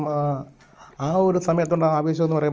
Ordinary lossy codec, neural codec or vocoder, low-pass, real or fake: Opus, 24 kbps; none; 7.2 kHz; real